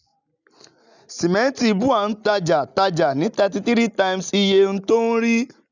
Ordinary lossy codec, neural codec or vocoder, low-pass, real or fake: none; none; 7.2 kHz; real